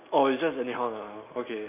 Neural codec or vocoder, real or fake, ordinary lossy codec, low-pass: none; real; none; 3.6 kHz